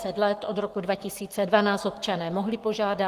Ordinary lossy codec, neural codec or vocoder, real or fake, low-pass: Opus, 24 kbps; codec, 44.1 kHz, 7.8 kbps, Pupu-Codec; fake; 14.4 kHz